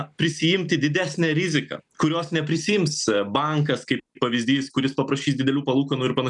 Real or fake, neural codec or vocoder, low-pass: real; none; 10.8 kHz